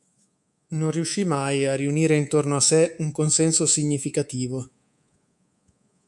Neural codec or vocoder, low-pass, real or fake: codec, 24 kHz, 3.1 kbps, DualCodec; 10.8 kHz; fake